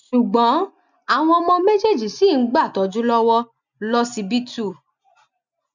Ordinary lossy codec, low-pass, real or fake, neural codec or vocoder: none; 7.2 kHz; real; none